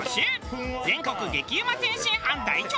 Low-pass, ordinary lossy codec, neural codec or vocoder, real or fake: none; none; none; real